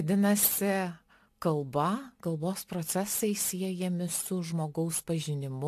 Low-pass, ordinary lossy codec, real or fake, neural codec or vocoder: 14.4 kHz; AAC, 64 kbps; real; none